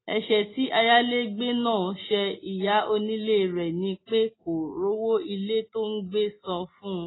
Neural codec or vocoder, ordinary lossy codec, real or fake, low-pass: none; AAC, 16 kbps; real; 7.2 kHz